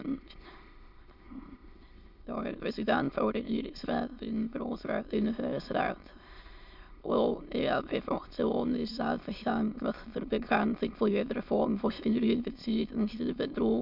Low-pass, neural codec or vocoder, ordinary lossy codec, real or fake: 5.4 kHz; autoencoder, 22.05 kHz, a latent of 192 numbers a frame, VITS, trained on many speakers; MP3, 48 kbps; fake